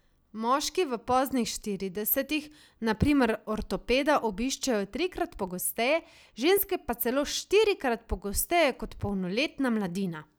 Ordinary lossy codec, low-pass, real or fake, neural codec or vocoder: none; none; real; none